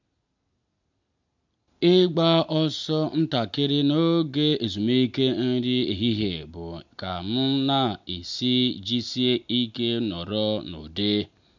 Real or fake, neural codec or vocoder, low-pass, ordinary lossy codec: real; none; 7.2 kHz; MP3, 64 kbps